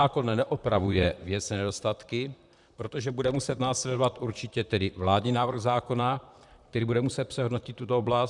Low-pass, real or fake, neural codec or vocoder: 10.8 kHz; fake; vocoder, 44.1 kHz, 128 mel bands, Pupu-Vocoder